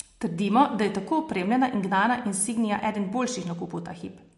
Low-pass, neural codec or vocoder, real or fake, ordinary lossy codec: 14.4 kHz; none; real; MP3, 48 kbps